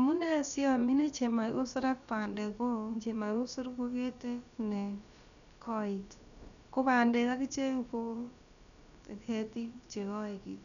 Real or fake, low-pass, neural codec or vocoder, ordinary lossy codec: fake; 7.2 kHz; codec, 16 kHz, about 1 kbps, DyCAST, with the encoder's durations; none